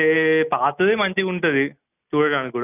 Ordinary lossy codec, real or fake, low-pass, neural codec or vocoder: none; real; 3.6 kHz; none